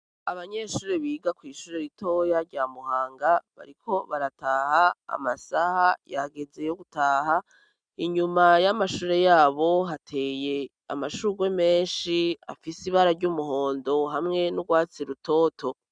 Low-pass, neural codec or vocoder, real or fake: 9.9 kHz; none; real